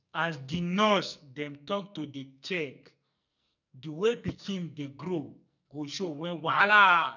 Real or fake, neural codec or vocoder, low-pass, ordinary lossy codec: fake; codec, 44.1 kHz, 2.6 kbps, SNAC; 7.2 kHz; none